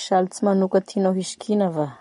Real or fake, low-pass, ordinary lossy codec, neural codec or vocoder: real; 19.8 kHz; MP3, 48 kbps; none